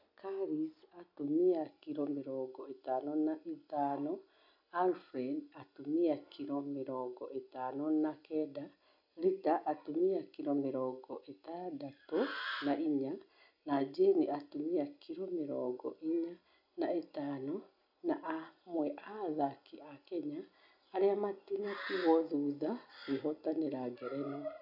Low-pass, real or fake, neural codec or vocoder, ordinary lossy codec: 5.4 kHz; real; none; none